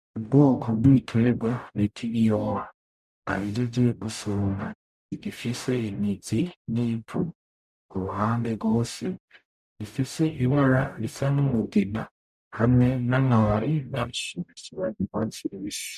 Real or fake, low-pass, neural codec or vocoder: fake; 14.4 kHz; codec, 44.1 kHz, 0.9 kbps, DAC